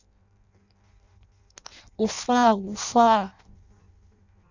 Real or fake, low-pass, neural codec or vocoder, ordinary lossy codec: fake; 7.2 kHz; codec, 16 kHz in and 24 kHz out, 0.6 kbps, FireRedTTS-2 codec; none